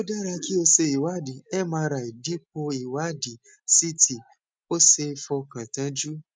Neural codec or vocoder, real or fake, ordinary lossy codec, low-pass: none; real; none; 9.9 kHz